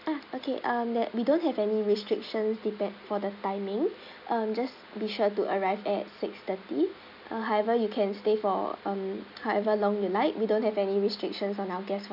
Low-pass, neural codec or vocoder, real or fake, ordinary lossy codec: 5.4 kHz; none; real; none